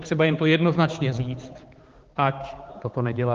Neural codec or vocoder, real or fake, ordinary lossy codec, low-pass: codec, 16 kHz, 4 kbps, X-Codec, HuBERT features, trained on balanced general audio; fake; Opus, 16 kbps; 7.2 kHz